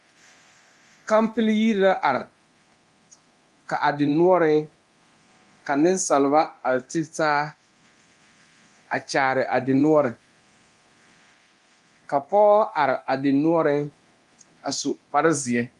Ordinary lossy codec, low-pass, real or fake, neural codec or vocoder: Opus, 32 kbps; 10.8 kHz; fake; codec, 24 kHz, 0.9 kbps, DualCodec